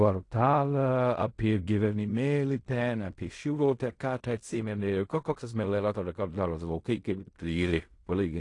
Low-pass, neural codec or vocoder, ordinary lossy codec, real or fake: 10.8 kHz; codec, 16 kHz in and 24 kHz out, 0.4 kbps, LongCat-Audio-Codec, fine tuned four codebook decoder; AAC, 48 kbps; fake